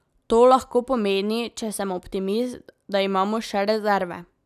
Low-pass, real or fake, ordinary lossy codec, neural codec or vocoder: 14.4 kHz; real; none; none